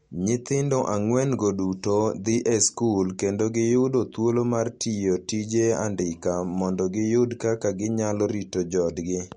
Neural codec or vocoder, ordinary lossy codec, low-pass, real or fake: none; MP3, 48 kbps; 9.9 kHz; real